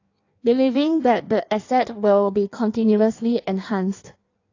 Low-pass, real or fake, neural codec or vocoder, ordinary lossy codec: 7.2 kHz; fake; codec, 16 kHz in and 24 kHz out, 1.1 kbps, FireRedTTS-2 codec; AAC, 48 kbps